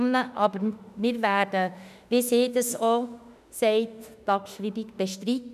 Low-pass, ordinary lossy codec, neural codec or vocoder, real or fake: 14.4 kHz; none; autoencoder, 48 kHz, 32 numbers a frame, DAC-VAE, trained on Japanese speech; fake